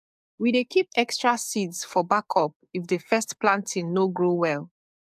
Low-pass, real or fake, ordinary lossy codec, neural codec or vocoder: 14.4 kHz; fake; AAC, 96 kbps; codec, 44.1 kHz, 7.8 kbps, DAC